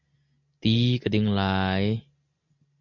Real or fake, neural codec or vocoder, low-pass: real; none; 7.2 kHz